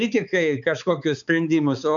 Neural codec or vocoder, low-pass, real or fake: codec, 16 kHz, 4 kbps, X-Codec, HuBERT features, trained on balanced general audio; 7.2 kHz; fake